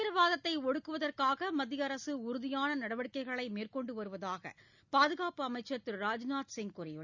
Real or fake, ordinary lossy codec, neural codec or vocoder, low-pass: real; none; none; 7.2 kHz